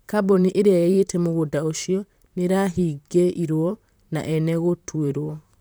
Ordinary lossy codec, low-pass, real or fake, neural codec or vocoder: none; none; fake; vocoder, 44.1 kHz, 128 mel bands, Pupu-Vocoder